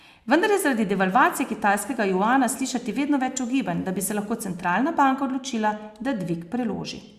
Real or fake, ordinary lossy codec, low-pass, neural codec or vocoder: real; Opus, 64 kbps; 14.4 kHz; none